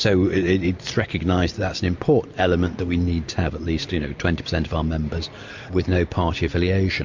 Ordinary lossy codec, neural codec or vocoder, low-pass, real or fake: MP3, 48 kbps; none; 7.2 kHz; real